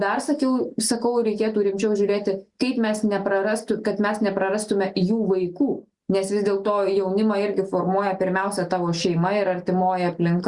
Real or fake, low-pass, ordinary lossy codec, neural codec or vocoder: real; 10.8 kHz; Opus, 64 kbps; none